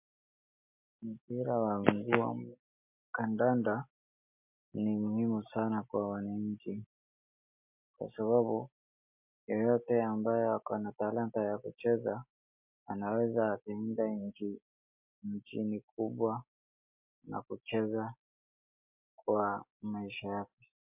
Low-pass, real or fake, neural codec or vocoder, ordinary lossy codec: 3.6 kHz; real; none; MP3, 32 kbps